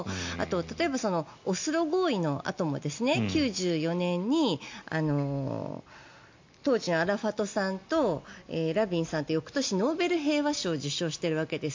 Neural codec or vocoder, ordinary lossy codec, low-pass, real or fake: none; MP3, 48 kbps; 7.2 kHz; real